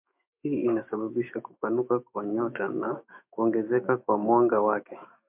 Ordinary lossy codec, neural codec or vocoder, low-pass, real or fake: MP3, 32 kbps; autoencoder, 48 kHz, 128 numbers a frame, DAC-VAE, trained on Japanese speech; 3.6 kHz; fake